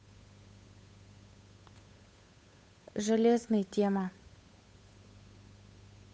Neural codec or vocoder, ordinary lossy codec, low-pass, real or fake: codec, 16 kHz, 8 kbps, FunCodec, trained on Chinese and English, 25 frames a second; none; none; fake